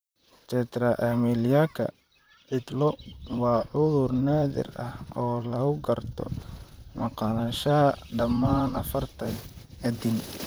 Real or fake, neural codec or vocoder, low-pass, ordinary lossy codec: fake; vocoder, 44.1 kHz, 128 mel bands, Pupu-Vocoder; none; none